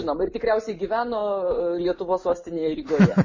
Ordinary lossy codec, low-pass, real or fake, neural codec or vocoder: MP3, 32 kbps; 7.2 kHz; real; none